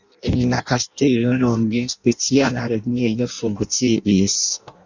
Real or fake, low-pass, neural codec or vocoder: fake; 7.2 kHz; codec, 16 kHz in and 24 kHz out, 0.6 kbps, FireRedTTS-2 codec